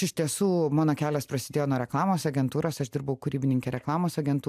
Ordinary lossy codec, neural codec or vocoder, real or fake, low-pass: AAC, 96 kbps; none; real; 14.4 kHz